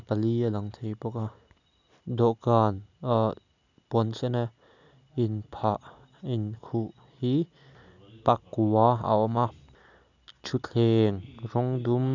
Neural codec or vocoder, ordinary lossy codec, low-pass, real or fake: none; none; 7.2 kHz; real